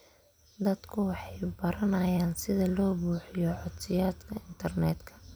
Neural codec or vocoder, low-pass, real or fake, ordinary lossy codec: none; none; real; none